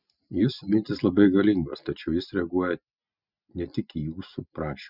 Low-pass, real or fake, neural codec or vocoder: 5.4 kHz; real; none